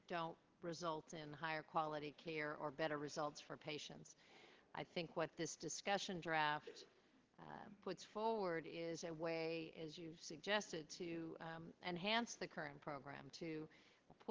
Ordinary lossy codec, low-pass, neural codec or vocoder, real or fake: Opus, 16 kbps; 7.2 kHz; none; real